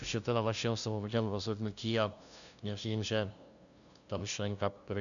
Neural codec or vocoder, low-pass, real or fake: codec, 16 kHz, 0.5 kbps, FunCodec, trained on LibriTTS, 25 frames a second; 7.2 kHz; fake